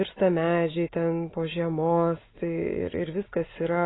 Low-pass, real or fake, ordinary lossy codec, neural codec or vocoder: 7.2 kHz; real; AAC, 16 kbps; none